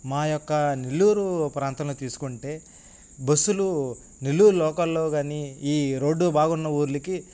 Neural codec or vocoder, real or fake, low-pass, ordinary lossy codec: none; real; none; none